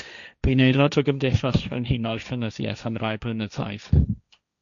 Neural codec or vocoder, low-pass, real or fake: codec, 16 kHz, 1.1 kbps, Voila-Tokenizer; 7.2 kHz; fake